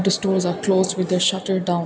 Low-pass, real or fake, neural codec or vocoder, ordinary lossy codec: none; real; none; none